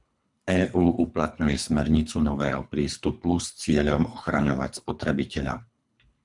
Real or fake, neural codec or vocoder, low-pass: fake; codec, 24 kHz, 3 kbps, HILCodec; 10.8 kHz